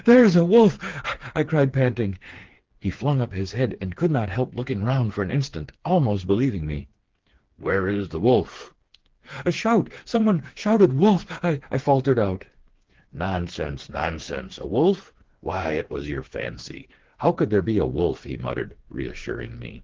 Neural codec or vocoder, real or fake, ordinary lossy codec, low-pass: codec, 16 kHz, 4 kbps, FreqCodec, smaller model; fake; Opus, 16 kbps; 7.2 kHz